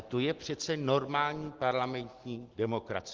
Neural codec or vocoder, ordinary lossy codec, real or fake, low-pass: none; Opus, 16 kbps; real; 7.2 kHz